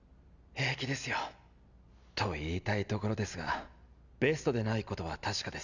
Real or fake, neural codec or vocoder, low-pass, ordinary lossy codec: real; none; 7.2 kHz; none